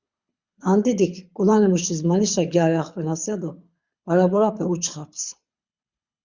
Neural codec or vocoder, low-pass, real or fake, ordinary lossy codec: codec, 24 kHz, 6 kbps, HILCodec; 7.2 kHz; fake; Opus, 64 kbps